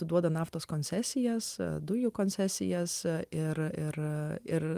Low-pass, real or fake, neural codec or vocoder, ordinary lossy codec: 14.4 kHz; real; none; Opus, 32 kbps